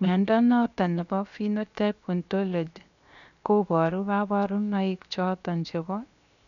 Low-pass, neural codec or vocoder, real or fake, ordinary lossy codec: 7.2 kHz; codec, 16 kHz, 0.7 kbps, FocalCodec; fake; none